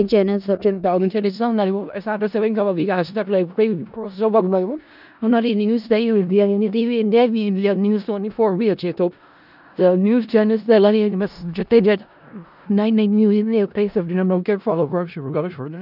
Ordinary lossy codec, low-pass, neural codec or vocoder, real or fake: none; 5.4 kHz; codec, 16 kHz in and 24 kHz out, 0.4 kbps, LongCat-Audio-Codec, four codebook decoder; fake